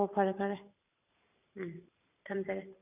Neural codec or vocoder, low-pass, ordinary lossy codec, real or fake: vocoder, 44.1 kHz, 128 mel bands every 512 samples, BigVGAN v2; 3.6 kHz; none; fake